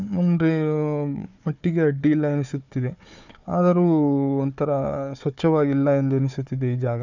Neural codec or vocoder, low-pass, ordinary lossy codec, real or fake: codec, 16 kHz, 4 kbps, FunCodec, trained on Chinese and English, 50 frames a second; 7.2 kHz; none; fake